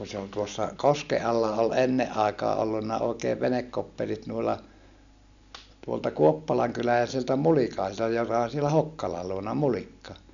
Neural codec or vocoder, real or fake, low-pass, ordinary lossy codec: none; real; 7.2 kHz; none